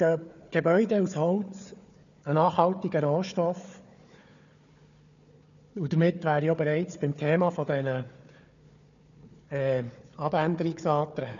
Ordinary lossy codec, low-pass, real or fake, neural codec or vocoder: none; 7.2 kHz; fake; codec, 16 kHz, 4 kbps, FunCodec, trained on Chinese and English, 50 frames a second